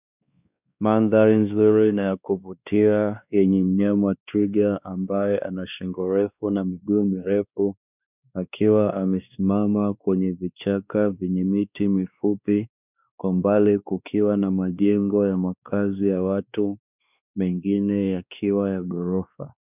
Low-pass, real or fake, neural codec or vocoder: 3.6 kHz; fake; codec, 16 kHz, 2 kbps, X-Codec, WavLM features, trained on Multilingual LibriSpeech